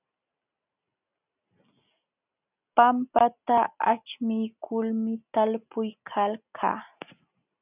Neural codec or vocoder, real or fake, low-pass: none; real; 3.6 kHz